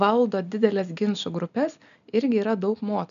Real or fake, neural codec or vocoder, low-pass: real; none; 7.2 kHz